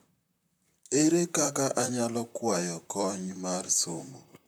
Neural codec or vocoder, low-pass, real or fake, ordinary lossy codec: vocoder, 44.1 kHz, 128 mel bands, Pupu-Vocoder; none; fake; none